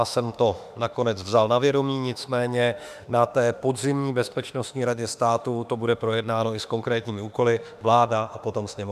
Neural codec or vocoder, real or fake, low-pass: autoencoder, 48 kHz, 32 numbers a frame, DAC-VAE, trained on Japanese speech; fake; 14.4 kHz